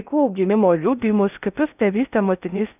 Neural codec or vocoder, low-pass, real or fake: codec, 16 kHz in and 24 kHz out, 0.6 kbps, FocalCodec, streaming, 4096 codes; 3.6 kHz; fake